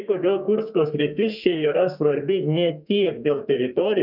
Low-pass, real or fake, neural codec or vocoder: 5.4 kHz; fake; codec, 32 kHz, 1.9 kbps, SNAC